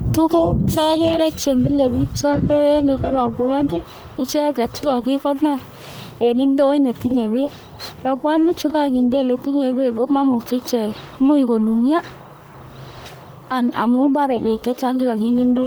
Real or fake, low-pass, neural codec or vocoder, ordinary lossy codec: fake; none; codec, 44.1 kHz, 1.7 kbps, Pupu-Codec; none